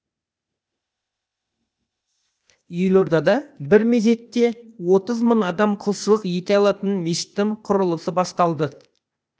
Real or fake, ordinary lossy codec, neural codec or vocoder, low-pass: fake; none; codec, 16 kHz, 0.8 kbps, ZipCodec; none